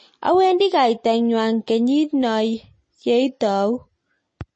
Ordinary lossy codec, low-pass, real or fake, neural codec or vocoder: MP3, 32 kbps; 9.9 kHz; real; none